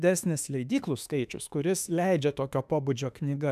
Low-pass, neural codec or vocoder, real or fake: 14.4 kHz; autoencoder, 48 kHz, 32 numbers a frame, DAC-VAE, trained on Japanese speech; fake